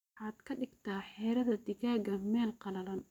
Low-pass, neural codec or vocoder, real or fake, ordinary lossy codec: 19.8 kHz; none; real; none